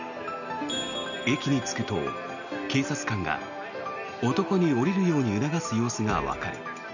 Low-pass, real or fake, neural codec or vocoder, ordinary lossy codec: 7.2 kHz; real; none; none